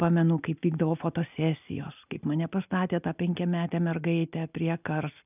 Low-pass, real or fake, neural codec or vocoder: 3.6 kHz; real; none